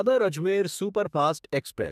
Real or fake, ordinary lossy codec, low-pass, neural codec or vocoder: fake; none; 14.4 kHz; codec, 32 kHz, 1.9 kbps, SNAC